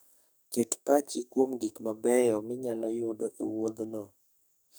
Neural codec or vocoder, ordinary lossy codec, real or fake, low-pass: codec, 44.1 kHz, 2.6 kbps, SNAC; none; fake; none